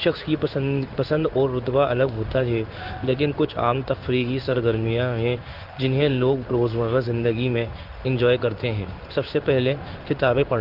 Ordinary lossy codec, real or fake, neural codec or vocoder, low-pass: Opus, 24 kbps; fake; codec, 16 kHz in and 24 kHz out, 1 kbps, XY-Tokenizer; 5.4 kHz